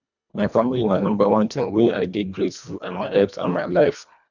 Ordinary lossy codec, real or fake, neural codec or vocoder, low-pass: none; fake; codec, 24 kHz, 1.5 kbps, HILCodec; 7.2 kHz